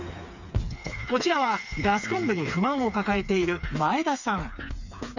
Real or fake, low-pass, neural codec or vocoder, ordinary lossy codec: fake; 7.2 kHz; codec, 16 kHz, 4 kbps, FreqCodec, smaller model; none